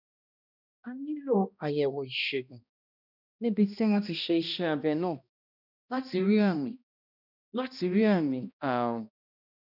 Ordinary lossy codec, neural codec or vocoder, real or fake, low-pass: none; codec, 16 kHz, 1 kbps, X-Codec, HuBERT features, trained on balanced general audio; fake; 5.4 kHz